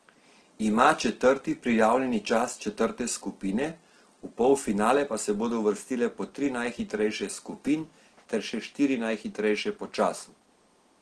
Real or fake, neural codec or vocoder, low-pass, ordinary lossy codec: real; none; 10.8 kHz; Opus, 16 kbps